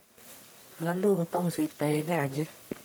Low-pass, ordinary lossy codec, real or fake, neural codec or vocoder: none; none; fake; codec, 44.1 kHz, 1.7 kbps, Pupu-Codec